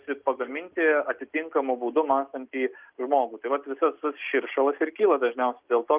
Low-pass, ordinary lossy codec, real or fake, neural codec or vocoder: 3.6 kHz; Opus, 32 kbps; real; none